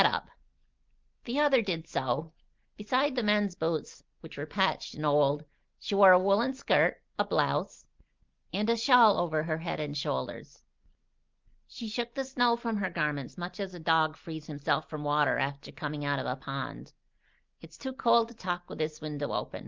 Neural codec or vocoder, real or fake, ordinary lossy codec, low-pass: none; real; Opus, 32 kbps; 7.2 kHz